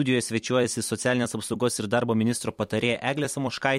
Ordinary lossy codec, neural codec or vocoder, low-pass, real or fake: MP3, 64 kbps; vocoder, 44.1 kHz, 128 mel bands every 256 samples, BigVGAN v2; 19.8 kHz; fake